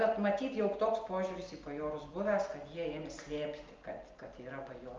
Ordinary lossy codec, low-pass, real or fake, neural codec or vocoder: Opus, 32 kbps; 7.2 kHz; real; none